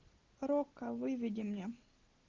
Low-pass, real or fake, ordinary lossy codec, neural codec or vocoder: 7.2 kHz; real; Opus, 16 kbps; none